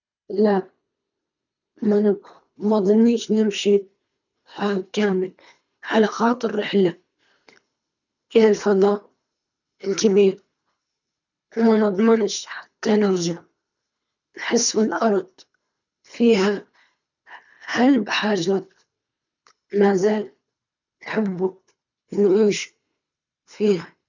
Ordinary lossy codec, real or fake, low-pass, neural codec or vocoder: none; fake; 7.2 kHz; codec, 24 kHz, 3 kbps, HILCodec